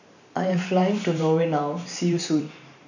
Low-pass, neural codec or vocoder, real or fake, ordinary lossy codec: 7.2 kHz; vocoder, 44.1 kHz, 128 mel bands every 512 samples, BigVGAN v2; fake; none